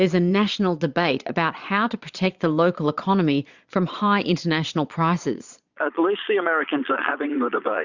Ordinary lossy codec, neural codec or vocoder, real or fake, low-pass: Opus, 64 kbps; vocoder, 22.05 kHz, 80 mel bands, Vocos; fake; 7.2 kHz